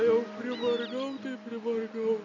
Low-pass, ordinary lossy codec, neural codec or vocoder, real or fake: 7.2 kHz; MP3, 64 kbps; none; real